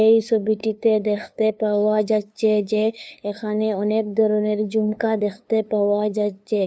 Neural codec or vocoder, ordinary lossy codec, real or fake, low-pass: codec, 16 kHz, 2 kbps, FunCodec, trained on LibriTTS, 25 frames a second; none; fake; none